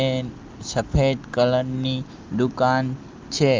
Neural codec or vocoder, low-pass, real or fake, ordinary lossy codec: none; 7.2 kHz; real; Opus, 24 kbps